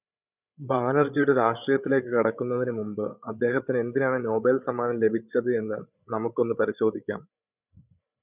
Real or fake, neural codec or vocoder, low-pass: fake; codec, 16 kHz, 16 kbps, FreqCodec, larger model; 3.6 kHz